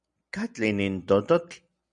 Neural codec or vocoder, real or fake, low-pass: none; real; 9.9 kHz